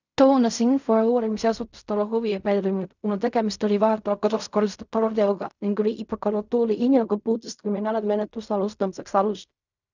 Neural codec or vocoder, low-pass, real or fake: codec, 16 kHz in and 24 kHz out, 0.4 kbps, LongCat-Audio-Codec, fine tuned four codebook decoder; 7.2 kHz; fake